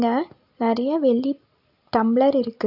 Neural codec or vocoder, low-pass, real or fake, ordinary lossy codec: none; 5.4 kHz; real; AAC, 48 kbps